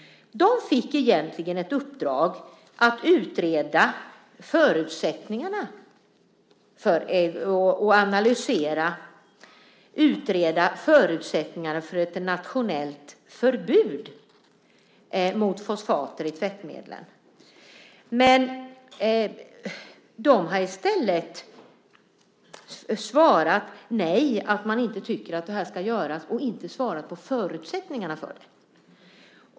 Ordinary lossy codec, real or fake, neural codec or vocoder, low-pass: none; real; none; none